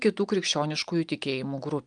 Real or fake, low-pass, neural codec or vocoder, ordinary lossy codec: real; 9.9 kHz; none; Opus, 32 kbps